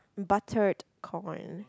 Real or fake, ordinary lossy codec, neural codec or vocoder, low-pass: real; none; none; none